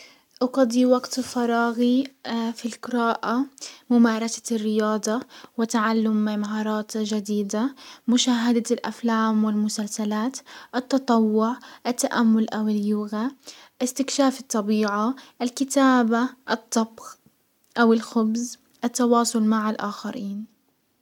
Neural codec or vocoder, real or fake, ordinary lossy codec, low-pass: none; real; none; 19.8 kHz